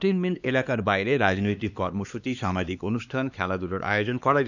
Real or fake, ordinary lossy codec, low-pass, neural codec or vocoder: fake; none; 7.2 kHz; codec, 16 kHz, 2 kbps, X-Codec, HuBERT features, trained on LibriSpeech